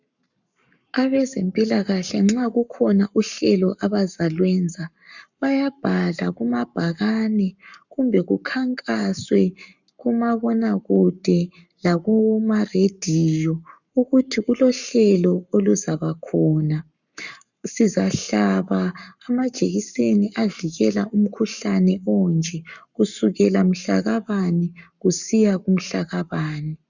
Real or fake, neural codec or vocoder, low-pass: fake; codec, 44.1 kHz, 7.8 kbps, Pupu-Codec; 7.2 kHz